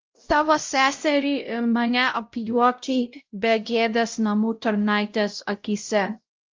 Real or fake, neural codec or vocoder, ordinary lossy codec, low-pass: fake; codec, 16 kHz, 0.5 kbps, X-Codec, WavLM features, trained on Multilingual LibriSpeech; Opus, 32 kbps; 7.2 kHz